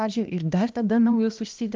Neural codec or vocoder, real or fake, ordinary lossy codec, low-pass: codec, 16 kHz, 1 kbps, X-Codec, HuBERT features, trained on balanced general audio; fake; Opus, 32 kbps; 7.2 kHz